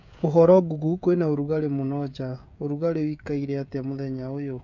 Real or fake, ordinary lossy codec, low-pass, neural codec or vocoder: fake; none; 7.2 kHz; codec, 16 kHz, 16 kbps, FreqCodec, smaller model